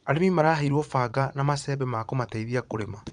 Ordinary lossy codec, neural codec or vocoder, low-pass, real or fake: none; vocoder, 22.05 kHz, 80 mel bands, Vocos; 9.9 kHz; fake